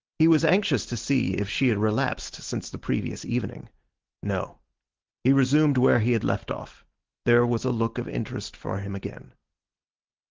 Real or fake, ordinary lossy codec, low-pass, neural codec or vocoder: real; Opus, 16 kbps; 7.2 kHz; none